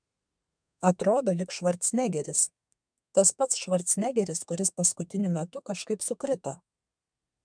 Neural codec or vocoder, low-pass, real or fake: codec, 32 kHz, 1.9 kbps, SNAC; 9.9 kHz; fake